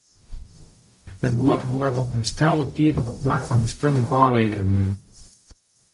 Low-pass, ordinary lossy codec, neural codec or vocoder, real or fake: 14.4 kHz; MP3, 48 kbps; codec, 44.1 kHz, 0.9 kbps, DAC; fake